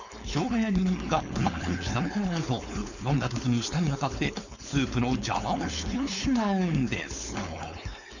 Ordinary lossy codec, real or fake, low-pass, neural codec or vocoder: none; fake; 7.2 kHz; codec, 16 kHz, 4.8 kbps, FACodec